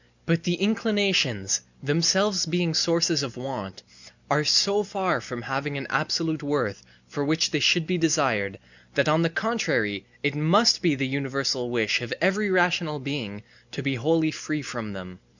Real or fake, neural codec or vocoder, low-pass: real; none; 7.2 kHz